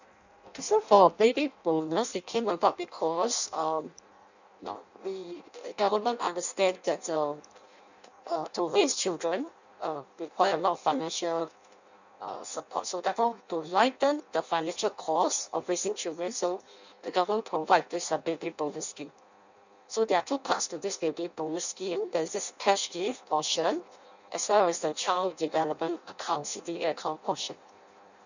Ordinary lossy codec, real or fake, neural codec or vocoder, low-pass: none; fake; codec, 16 kHz in and 24 kHz out, 0.6 kbps, FireRedTTS-2 codec; 7.2 kHz